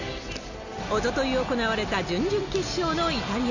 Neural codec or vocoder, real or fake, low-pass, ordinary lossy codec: none; real; 7.2 kHz; none